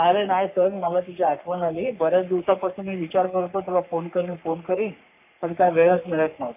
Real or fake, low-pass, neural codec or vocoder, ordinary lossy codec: fake; 3.6 kHz; codec, 44.1 kHz, 3.4 kbps, Pupu-Codec; AAC, 32 kbps